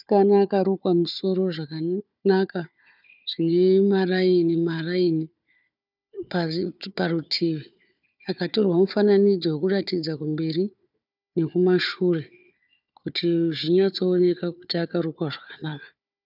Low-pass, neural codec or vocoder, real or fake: 5.4 kHz; codec, 16 kHz, 4 kbps, FunCodec, trained on Chinese and English, 50 frames a second; fake